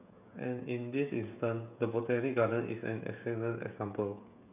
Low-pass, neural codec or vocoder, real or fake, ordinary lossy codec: 3.6 kHz; codec, 16 kHz, 16 kbps, FreqCodec, smaller model; fake; none